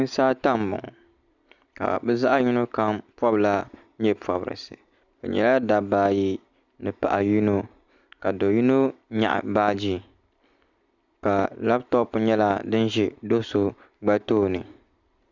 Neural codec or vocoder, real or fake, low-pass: none; real; 7.2 kHz